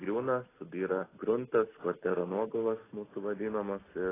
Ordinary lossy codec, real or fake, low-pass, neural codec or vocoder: AAC, 16 kbps; real; 3.6 kHz; none